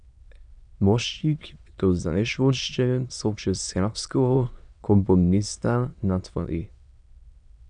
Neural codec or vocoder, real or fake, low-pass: autoencoder, 22.05 kHz, a latent of 192 numbers a frame, VITS, trained on many speakers; fake; 9.9 kHz